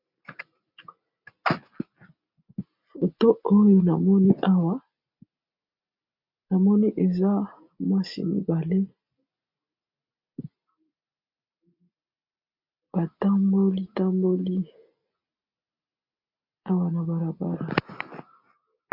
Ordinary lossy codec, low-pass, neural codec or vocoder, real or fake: MP3, 48 kbps; 5.4 kHz; none; real